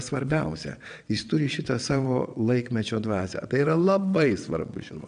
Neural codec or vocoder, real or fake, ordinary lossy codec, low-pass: vocoder, 22.05 kHz, 80 mel bands, WaveNeXt; fake; MP3, 96 kbps; 9.9 kHz